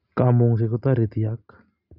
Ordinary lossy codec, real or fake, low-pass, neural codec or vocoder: none; real; 5.4 kHz; none